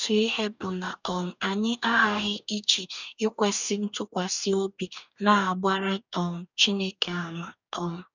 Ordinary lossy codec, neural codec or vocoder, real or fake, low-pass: none; codec, 44.1 kHz, 2.6 kbps, DAC; fake; 7.2 kHz